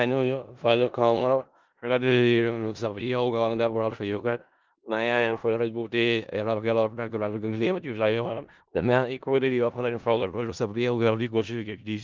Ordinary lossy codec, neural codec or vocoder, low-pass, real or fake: Opus, 32 kbps; codec, 16 kHz in and 24 kHz out, 0.4 kbps, LongCat-Audio-Codec, four codebook decoder; 7.2 kHz; fake